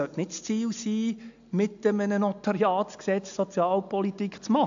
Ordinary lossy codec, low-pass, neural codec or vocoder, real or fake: AAC, 64 kbps; 7.2 kHz; none; real